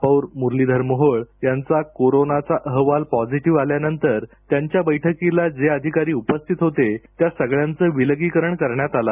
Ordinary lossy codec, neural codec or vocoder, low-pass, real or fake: none; none; 3.6 kHz; real